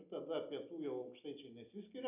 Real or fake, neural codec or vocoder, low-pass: real; none; 3.6 kHz